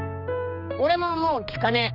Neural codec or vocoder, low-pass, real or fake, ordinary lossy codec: codec, 16 kHz, 2 kbps, X-Codec, HuBERT features, trained on general audio; 5.4 kHz; fake; none